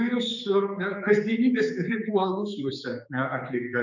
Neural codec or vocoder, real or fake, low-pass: codec, 16 kHz, 4 kbps, X-Codec, HuBERT features, trained on general audio; fake; 7.2 kHz